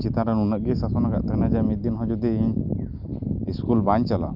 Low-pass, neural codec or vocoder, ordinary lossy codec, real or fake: 5.4 kHz; none; Opus, 24 kbps; real